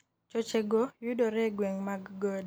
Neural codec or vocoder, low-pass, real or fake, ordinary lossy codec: none; none; real; none